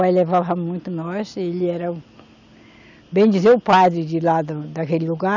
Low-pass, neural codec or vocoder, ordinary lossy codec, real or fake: 7.2 kHz; none; none; real